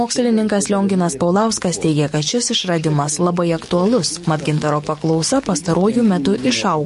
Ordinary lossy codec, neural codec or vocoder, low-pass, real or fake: MP3, 48 kbps; codec, 44.1 kHz, 7.8 kbps, DAC; 14.4 kHz; fake